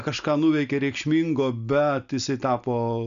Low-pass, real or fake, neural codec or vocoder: 7.2 kHz; real; none